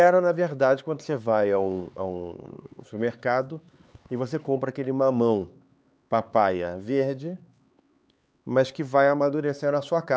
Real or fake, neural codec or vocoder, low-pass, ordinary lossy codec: fake; codec, 16 kHz, 4 kbps, X-Codec, HuBERT features, trained on LibriSpeech; none; none